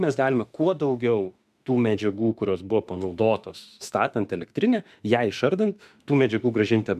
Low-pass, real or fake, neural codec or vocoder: 14.4 kHz; fake; autoencoder, 48 kHz, 32 numbers a frame, DAC-VAE, trained on Japanese speech